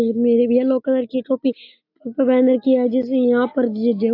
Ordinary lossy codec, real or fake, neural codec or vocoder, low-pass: AAC, 32 kbps; real; none; 5.4 kHz